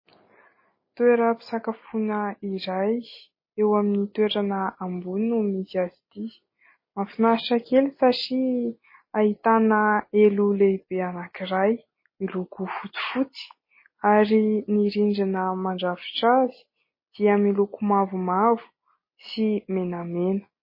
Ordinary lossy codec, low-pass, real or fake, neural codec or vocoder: MP3, 24 kbps; 5.4 kHz; real; none